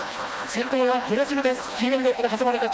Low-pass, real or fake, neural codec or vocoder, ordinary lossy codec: none; fake; codec, 16 kHz, 1 kbps, FreqCodec, smaller model; none